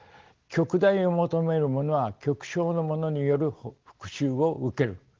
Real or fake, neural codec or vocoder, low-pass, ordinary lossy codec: real; none; 7.2 kHz; Opus, 16 kbps